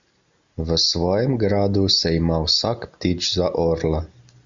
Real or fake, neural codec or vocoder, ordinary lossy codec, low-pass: real; none; Opus, 64 kbps; 7.2 kHz